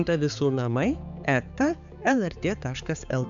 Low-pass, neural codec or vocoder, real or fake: 7.2 kHz; codec, 16 kHz, 4 kbps, X-Codec, HuBERT features, trained on balanced general audio; fake